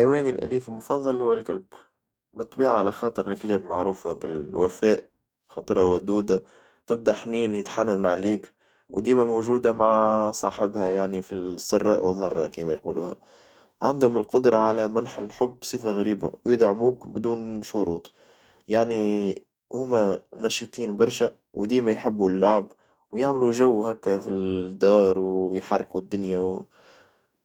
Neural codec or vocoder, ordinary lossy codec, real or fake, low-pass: codec, 44.1 kHz, 2.6 kbps, DAC; none; fake; 19.8 kHz